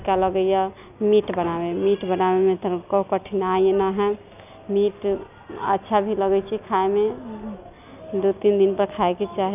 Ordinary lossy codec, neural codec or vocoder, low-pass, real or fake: none; none; 3.6 kHz; real